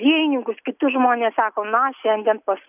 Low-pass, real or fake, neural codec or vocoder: 3.6 kHz; real; none